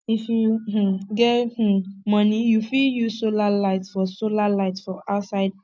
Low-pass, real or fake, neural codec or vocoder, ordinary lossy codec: none; real; none; none